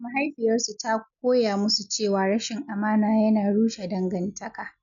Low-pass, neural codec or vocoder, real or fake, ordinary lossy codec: 7.2 kHz; none; real; none